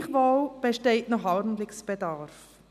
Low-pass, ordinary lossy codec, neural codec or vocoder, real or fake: 14.4 kHz; none; none; real